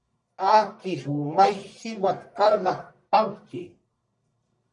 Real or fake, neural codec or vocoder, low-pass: fake; codec, 44.1 kHz, 1.7 kbps, Pupu-Codec; 10.8 kHz